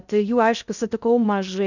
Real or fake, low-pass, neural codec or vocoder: fake; 7.2 kHz; codec, 16 kHz in and 24 kHz out, 0.6 kbps, FocalCodec, streaming, 2048 codes